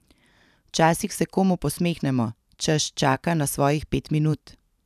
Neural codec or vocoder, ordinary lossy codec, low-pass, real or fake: vocoder, 44.1 kHz, 128 mel bands every 512 samples, BigVGAN v2; none; 14.4 kHz; fake